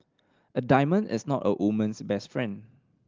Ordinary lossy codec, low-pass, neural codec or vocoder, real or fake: Opus, 32 kbps; 7.2 kHz; none; real